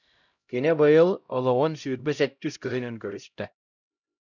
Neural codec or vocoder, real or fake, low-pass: codec, 16 kHz, 0.5 kbps, X-Codec, HuBERT features, trained on LibriSpeech; fake; 7.2 kHz